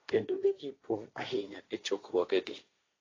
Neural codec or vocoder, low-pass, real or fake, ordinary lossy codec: codec, 16 kHz, 1.1 kbps, Voila-Tokenizer; 7.2 kHz; fake; AAC, 32 kbps